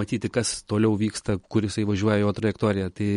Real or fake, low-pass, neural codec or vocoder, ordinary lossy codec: real; 10.8 kHz; none; MP3, 48 kbps